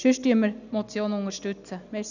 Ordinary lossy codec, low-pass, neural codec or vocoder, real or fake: none; 7.2 kHz; none; real